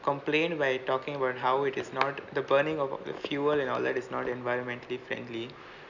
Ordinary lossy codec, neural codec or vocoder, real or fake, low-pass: none; none; real; 7.2 kHz